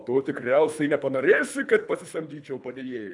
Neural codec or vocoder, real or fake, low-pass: codec, 24 kHz, 3 kbps, HILCodec; fake; 10.8 kHz